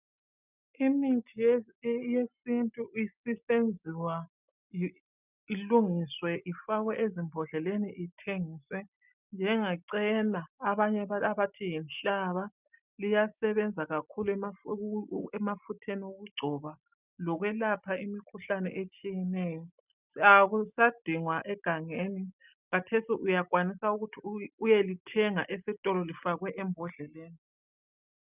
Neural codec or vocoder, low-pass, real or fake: none; 3.6 kHz; real